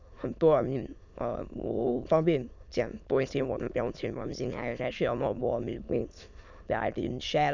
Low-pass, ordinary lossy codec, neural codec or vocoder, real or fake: 7.2 kHz; none; autoencoder, 22.05 kHz, a latent of 192 numbers a frame, VITS, trained on many speakers; fake